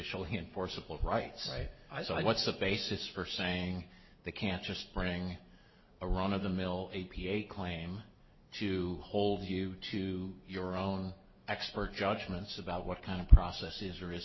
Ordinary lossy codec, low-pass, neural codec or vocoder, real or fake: MP3, 24 kbps; 7.2 kHz; none; real